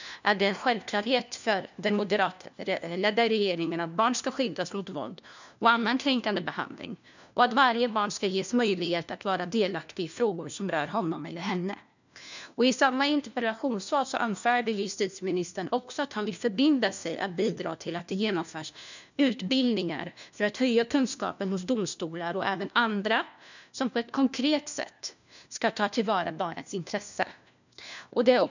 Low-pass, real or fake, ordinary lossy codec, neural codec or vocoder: 7.2 kHz; fake; none; codec, 16 kHz, 1 kbps, FunCodec, trained on LibriTTS, 50 frames a second